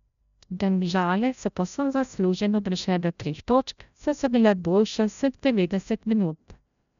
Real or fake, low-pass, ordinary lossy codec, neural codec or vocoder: fake; 7.2 kHz; none; codec, 16 kHz, 0.5 kbps, FreqCodec, larger model